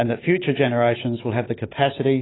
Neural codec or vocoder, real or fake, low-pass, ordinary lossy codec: none; real; 7.2 kHz; AAC, 16 kbps